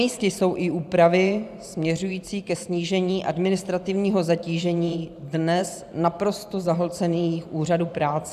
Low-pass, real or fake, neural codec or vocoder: 14.4 kHz; fake; vocoder, 44.1 kHz, 128 mel bands every 512 samples, BigVGAN v2